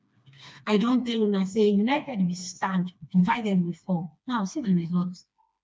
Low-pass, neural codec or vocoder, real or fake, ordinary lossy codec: none; codec, 16 kHz, 2 kbps, FreqCodec, smaller model; fake; none